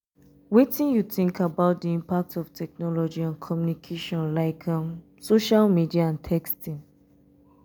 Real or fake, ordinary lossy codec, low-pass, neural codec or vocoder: real; none; none; none